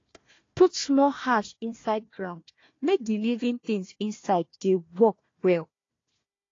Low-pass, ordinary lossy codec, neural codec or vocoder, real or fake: 7.2 kHz; AAC, 32 kbps; codec, 16 kHz, 1 kbps, FunCodec, trained on Chinese and English, 50 frames a second; fake